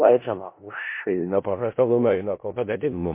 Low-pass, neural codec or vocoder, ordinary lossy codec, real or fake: 3.6 kHz; codec, 16 kHz in and 24 kHz out, 0.4 kbps, LongCat-Audio-Codec, four codebook decoder; MP3, 24 kbps; fake